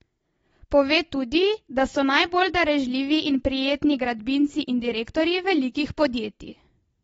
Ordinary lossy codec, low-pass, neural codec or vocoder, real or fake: AAC, 24 kbps; 19.8 kHz; none; real